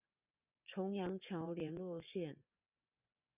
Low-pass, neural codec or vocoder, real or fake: 3.6 kHz; vocoder, 24 kHz, 100 mel bands, Vocos; fake